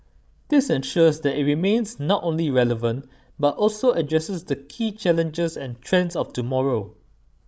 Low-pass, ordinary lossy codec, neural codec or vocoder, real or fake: none; none; codec, 16 kHz, 16 kbps, FreqCodec, larger model; fake